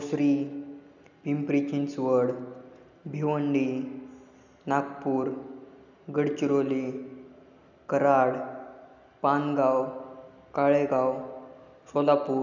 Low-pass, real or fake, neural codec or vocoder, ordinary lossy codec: 7.2 kHz; real; none; none